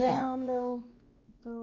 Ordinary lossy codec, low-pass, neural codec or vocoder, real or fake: Opus, 32 kbps; 7.2 kHz; codec, 16 kHz, 2 kbps, X-Codec, WavLM features, trained on Multilingual LibriSpeech; fake